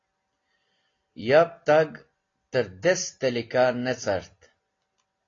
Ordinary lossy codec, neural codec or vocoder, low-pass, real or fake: AAC, 32 kbps; none; 7.2 kHz; real